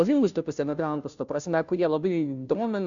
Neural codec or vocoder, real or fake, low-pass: codec, 16 kHz, 0.5 kbps, FunCodec, trained on Chinese and English, 25 frames a second; fake; 7.2 kHz